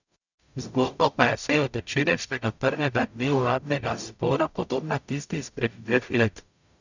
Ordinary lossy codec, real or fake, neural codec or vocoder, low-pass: none; fake; codec, 44.1 kHz, 0.9 kbps, DAC; 7.2 kHz